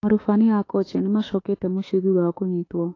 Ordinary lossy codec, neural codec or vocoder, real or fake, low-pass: AAC, 32 kbps; autoencoder, 48 kHz, 32 numbers a frame, DAC-VAE, trained on Japanese speech; fake; 7.2 kHz